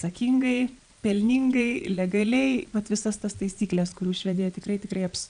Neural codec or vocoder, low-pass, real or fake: vocoder, 22.05 kHz, 80 mel bands, Vocos; 9.9 kHz; fake